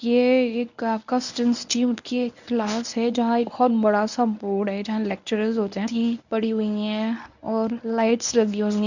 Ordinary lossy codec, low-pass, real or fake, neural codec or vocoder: none; 7.2 kHz; fake; codec, 24 kHz, 0.9 kbps, WavTokenizer, medium speech release version 1